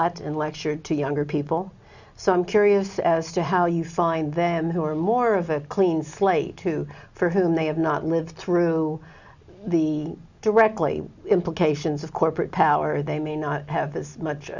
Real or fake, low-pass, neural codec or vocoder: real; 7.2 kHz; none